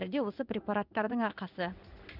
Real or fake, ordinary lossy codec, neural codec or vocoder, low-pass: fake; none; codec, 16 kHz in and 24 kHz out, 1 kbps, XY-Tokenizer; 5.4 kHz